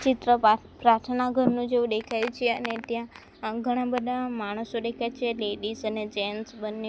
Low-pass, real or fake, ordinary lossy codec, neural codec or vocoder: none; real; none; none